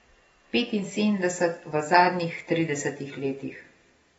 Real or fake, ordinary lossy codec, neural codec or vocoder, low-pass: real; AAC, 24 kbps; none; 9.9 kHz